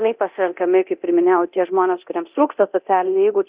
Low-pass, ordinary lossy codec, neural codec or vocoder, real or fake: 3.6 kHz; Opus, 64 kbps; codec, 24 kHz, 0.9 kbps, DualCodec; fake